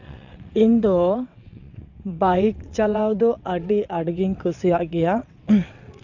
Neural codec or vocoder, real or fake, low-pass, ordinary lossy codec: vocoder, 22.05 kHz, 80 mel bands, WaveNeXt; fake; 7.2 kHz; none